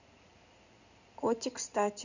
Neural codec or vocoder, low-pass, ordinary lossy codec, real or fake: codec, 16 kHz in and 24 kHz out, 2.2 kbps, FireRedTTS-2 codec; 7.2 kHz; none; fake